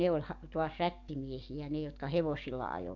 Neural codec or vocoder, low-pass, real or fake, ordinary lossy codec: codec, 44.1 kHz, 7.8 kbps, DAC; 7.2 kHz; fake; none